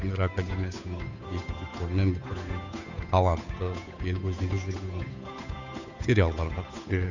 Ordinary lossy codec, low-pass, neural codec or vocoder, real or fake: none; 7.2 kHz; codec, 16 kHz, 8 kbps, FunCodec, trained on Chinese and English, 25 frames a second; fake